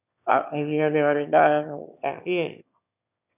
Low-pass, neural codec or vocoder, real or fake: 3.6 kHz; autoencoder, 22.05 kHz, a latent of 192 numbers a frame, VITS, trained on one speaker; fake